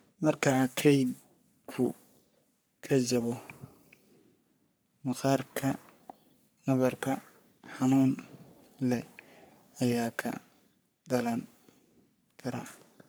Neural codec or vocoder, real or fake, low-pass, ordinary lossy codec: codec, 44.1 kHz, 3.4 kbps, Pupu-Codec; fake; none; none